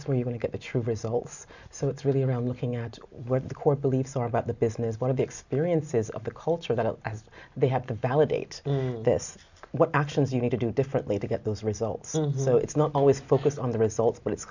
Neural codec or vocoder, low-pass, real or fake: none; 7.2 kHz; real